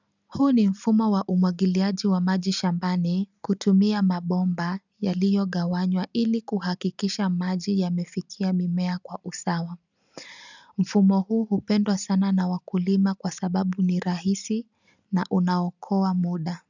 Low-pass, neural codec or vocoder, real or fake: 7.2 kHz; none; real